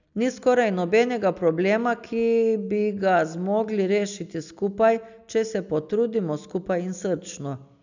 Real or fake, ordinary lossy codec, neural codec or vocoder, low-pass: real; none; none; 7.2 kHz